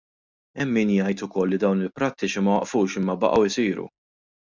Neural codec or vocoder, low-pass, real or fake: none; 7.2 kHz; real